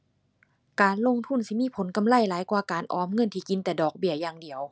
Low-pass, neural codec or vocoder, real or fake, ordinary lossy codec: none; none; real; none